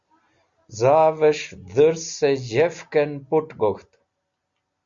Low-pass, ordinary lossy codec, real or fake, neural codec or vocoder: 7.2 kHz; Opus, 64 kbps; real; none